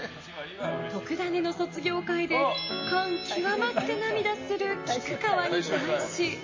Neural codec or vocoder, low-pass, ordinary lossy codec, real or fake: none; 7.2 kHz; MP3, 32 kbps; real